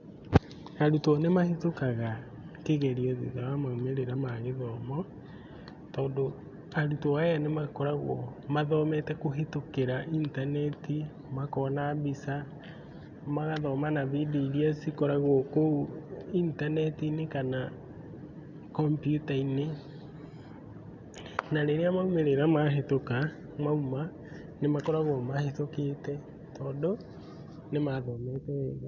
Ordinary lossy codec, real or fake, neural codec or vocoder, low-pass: none; real; none; 7.2 kHz